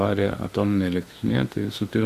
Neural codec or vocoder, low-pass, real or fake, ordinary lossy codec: autoencoder, 48 kHz, 32 numbers a frame, DAC-VAE, trained on Japanese speech; 14.4 kHz; fake; Opus, 64 kbps